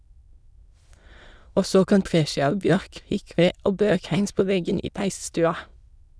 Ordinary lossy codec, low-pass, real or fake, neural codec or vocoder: none; none; fake; autoencoder, 22.05 kHz, a latent of 192 numbers a frame, VITS, trained on many speakers